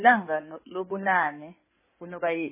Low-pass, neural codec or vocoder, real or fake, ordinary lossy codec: 3.6 kHz; codec, 16 kHz in and 24 kHz out, 2.2 kbps, FireRedTTS-2 codec; fake; MP3, 16 kbps